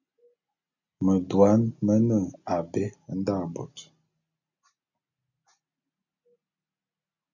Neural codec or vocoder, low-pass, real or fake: none; 7.2 kHz; real